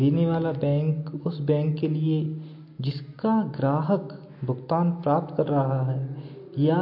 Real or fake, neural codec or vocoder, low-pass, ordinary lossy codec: real; none; 5.4 kHz; MP3, 32 kbps